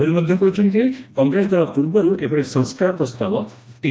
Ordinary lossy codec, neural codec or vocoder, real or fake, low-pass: none; codec, 16 kHz, 1 kbps, FreqCodec, smaller model; fake; none